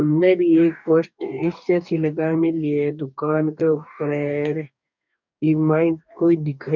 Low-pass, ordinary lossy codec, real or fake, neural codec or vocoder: 7.2 kHz; none; fake; codec, 44.1 kHz, 2.6 kbps, DAC